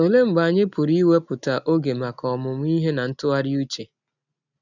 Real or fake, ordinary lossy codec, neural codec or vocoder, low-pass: real; none; none; 7.2 kHz